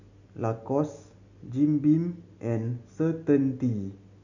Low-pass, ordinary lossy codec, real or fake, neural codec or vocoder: 7.2 kHz; none; real; none